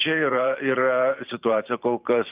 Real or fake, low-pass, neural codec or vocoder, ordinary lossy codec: fake; 3.6 kHz; vocoder, 44.1 kHz, 128 mel bands every 512 samples, BigVGAN v2; Opus, 32 kbps